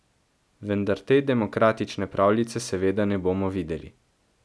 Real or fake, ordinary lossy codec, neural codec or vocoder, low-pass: real; none; none; none